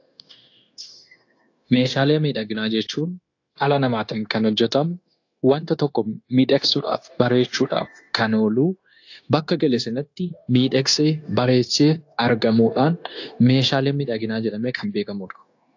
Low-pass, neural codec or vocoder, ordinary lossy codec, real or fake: 7.2 kHz; codec, 16 kHz, 0.9 kbps, LongCat-Audio-Codec; AAC, 48 kbps; fake